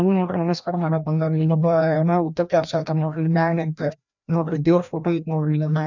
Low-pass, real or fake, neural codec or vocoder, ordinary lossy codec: 7.2 kHz; fake; codec, 16 kHz, 1 kbps, FreqCodec, larger model; none